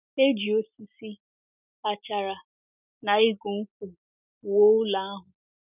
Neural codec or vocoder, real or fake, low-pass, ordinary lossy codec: none; real; 3.6 kHz; none